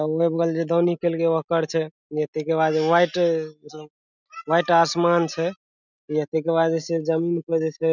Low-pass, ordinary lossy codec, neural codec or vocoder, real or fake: 7.2 kHz; none; none; real